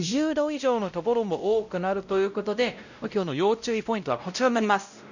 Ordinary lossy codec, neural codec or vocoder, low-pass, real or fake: none; codec, 16 kHz, 0.5 kbps, X-Codec, WavLM features, trained on Multilingual LibriSpeech; 7.2 kHz; fake